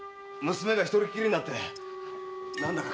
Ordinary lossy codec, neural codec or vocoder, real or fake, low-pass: none; none; real; none